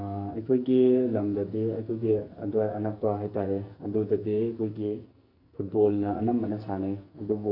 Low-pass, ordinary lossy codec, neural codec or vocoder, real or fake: 5.4 kHz; none; codec, 44.1 kHz, 2.6 kbps, SNAC; fake